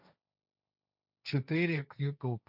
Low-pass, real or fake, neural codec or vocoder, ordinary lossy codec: 5.4 kHz; fake; codec, 16 kHz, 1.1 kbps, Voila-Tokenizer; none